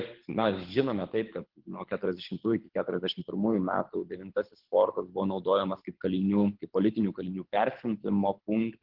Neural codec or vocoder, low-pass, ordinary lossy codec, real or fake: codec, 24 kHz, 6 kbps, HILCodec; 5.4 kHz; Opus, 16 kbps; fake